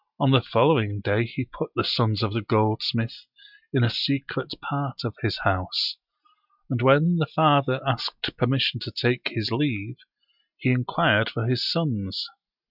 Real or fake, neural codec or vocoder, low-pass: real; none; 5.4 kHz